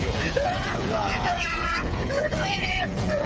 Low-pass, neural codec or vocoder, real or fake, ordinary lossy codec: none; codec, 16 kHz, 8 kbps, FreqCodec, smaller model; fake; none